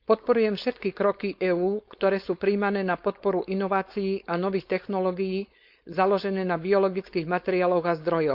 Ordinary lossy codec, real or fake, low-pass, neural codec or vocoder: Opus, 64 kbps; fake; 5.4 kHz; codec, 16 kHz, 4.8 kbps, FACodec